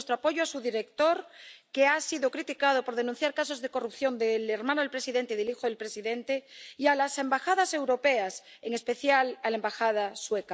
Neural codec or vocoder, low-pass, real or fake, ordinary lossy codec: none; none; real; none